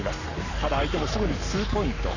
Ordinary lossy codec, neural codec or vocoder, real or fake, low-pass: none; codec, 44.1 kHz, 7.8 kbps, Pupu-Codec; fake; 7.2 kHz